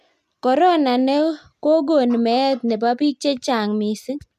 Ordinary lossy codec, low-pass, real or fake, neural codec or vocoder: none; 14.4 kHz; real; none